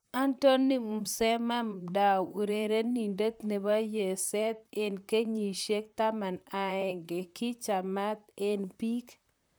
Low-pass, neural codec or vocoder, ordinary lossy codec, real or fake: none; vocoder, 44.1 kHz, 128 mel bands, Pupu-Vocoder; none; fake